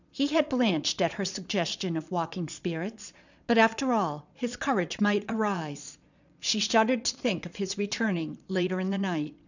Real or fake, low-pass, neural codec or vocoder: fake; 7.2 kHz; vocoder, 22.05 kHz, 80 mel bands, Vocos